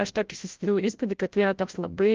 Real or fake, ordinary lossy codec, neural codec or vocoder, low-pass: fake; Opus, 24 kbps; codec, 16 kHz, 0.5 kbps, FreqCodec, larger model; 7.2 kHz